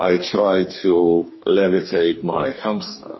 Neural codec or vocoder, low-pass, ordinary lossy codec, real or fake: codec, 44.1 kHz, 2.6 kbps, DAC; 7.2 kHz; MP3, 24 kbps; fake